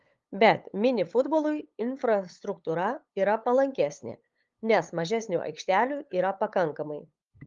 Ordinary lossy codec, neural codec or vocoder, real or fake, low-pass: Opus, 32 kbps; codec, 16 kHz, 8 kbps, FunCodec, trained on LibriTTS, 25 frames a second; fake; 7.2 kHz